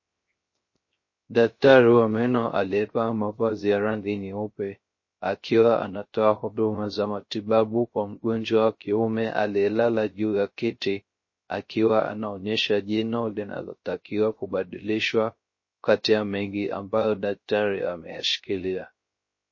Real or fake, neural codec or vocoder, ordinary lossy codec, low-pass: fake; codec, 16 kHz, 0.3 kbps, FocalCodec; MP3, 32 kbps; 7.2 kHz